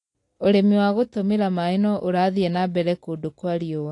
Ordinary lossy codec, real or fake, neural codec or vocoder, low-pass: AAC, 48 kbps; real; none; 10.8 kHz